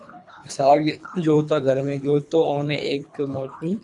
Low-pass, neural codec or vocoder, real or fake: 10.8 kHz; codec, 24 kHz, 3 kbps, HILCodec; fake